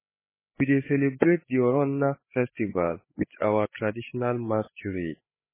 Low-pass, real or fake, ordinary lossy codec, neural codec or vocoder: 3.6 kHz; fake; MP3, 16 kbps; codec, 16 kHz, 8 kbps, FreqCodec, larger model